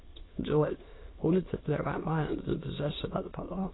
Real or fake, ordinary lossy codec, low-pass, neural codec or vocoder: fake; AAC, 16 kbps; 7.2 kHz; autoencoder, 22.05 kHz, a latent of 192 numbers a frame, VITS, trained on many speakers